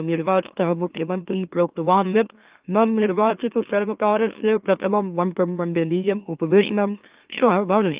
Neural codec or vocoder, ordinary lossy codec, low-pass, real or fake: autoencoder, 44.1 kHz, a latent of 192 numbers a frame, MeloTTS; Opus, 24 kbps; 3.6 kHz; fake